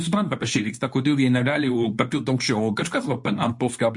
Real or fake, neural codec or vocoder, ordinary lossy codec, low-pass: fake; codec, 24 kHz, 0.9 kbps, WavTokenizer, medium speech release version 2; MP3, 48 kbps; 10.8 kHz